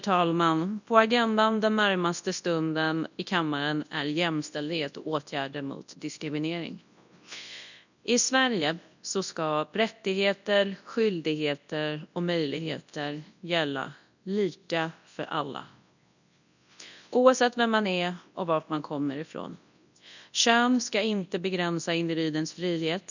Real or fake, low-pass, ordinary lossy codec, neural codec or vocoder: fake; 7.2 kHz; none; codec, 24 kHz, 0.9 kbps, WavTokenizer, large speech release